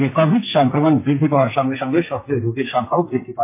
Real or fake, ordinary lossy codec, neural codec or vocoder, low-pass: fake; MP3, 24 kbps; codec, 44.1 kHz, 2.6 kbps, SNAC; 3.6 kHz